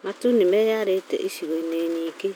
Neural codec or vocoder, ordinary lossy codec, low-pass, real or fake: none; none; none; real